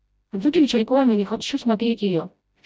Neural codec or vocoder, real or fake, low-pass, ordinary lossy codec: codec, 16 kHz, 0.5 kbps, FreqCodec, smaller model; fake; none; none